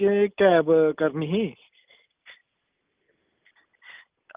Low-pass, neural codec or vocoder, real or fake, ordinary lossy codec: 3.6 kHz; none; real; Opus, 24 kbps